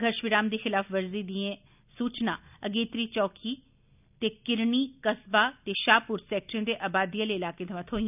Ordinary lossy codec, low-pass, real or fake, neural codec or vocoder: none; 3.6 kHz; real; none